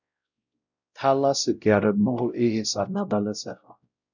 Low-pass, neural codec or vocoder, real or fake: 7.2 kHz; codec, 16 kHz, 0.5 kbps, X-Codec, WavLM features, trained on Multilingual LibriSpeech; fake